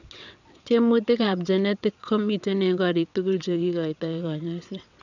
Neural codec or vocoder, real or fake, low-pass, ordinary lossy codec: vocoder, 22.05 kHz, 80 mel bands, WaveNeXt; fake; 7.2 kHz; none